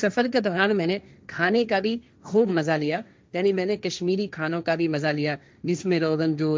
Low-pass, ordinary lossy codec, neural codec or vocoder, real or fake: none; none; codec, 16 kHz, 1.1 kbps, Voila-Tokenizer; fake